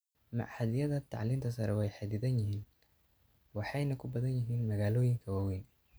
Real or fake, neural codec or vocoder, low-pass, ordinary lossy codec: real; none; none; none